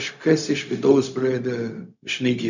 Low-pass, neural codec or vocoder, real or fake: 7.2 kHz; codec, 16 kHz, 0.4 kbps, LongCat-Audio-Codec; fake